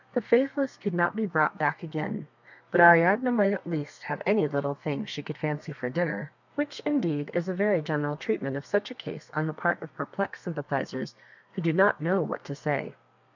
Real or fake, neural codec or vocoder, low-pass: fake; codec, 32 kHz, 1.9 kbps, SNAC; 7.2 kHz